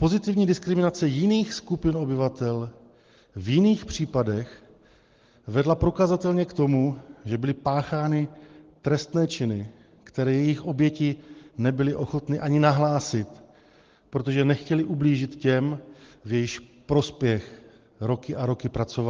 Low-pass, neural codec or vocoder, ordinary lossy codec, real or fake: 7.2 kHz; none; Opus, 16 kbps; real